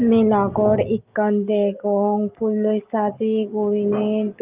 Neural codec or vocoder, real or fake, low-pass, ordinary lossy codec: none; real; 3.6 kHz; Opus, 32 kbps